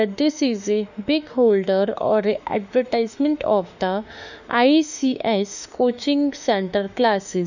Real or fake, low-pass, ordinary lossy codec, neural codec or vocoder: fake; 7.2 kHz; none; autoencoder, 48 kHz, 32 numbers a frame, DAC-VAE, trained on Japanese speech